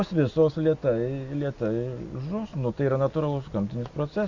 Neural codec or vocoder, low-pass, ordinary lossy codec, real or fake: none; 7.2 kHz; Opus, 64 kbps; real